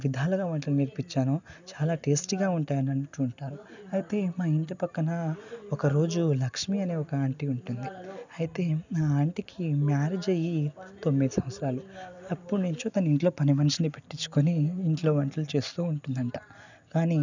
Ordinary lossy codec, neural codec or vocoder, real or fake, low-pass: none; none; real; 7.2 kHz